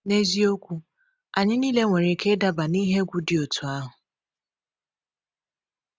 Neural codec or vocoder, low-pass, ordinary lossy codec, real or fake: none; none; none; real